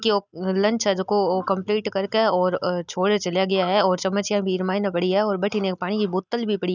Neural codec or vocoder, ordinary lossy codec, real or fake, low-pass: none; none; real; 7.2 kHz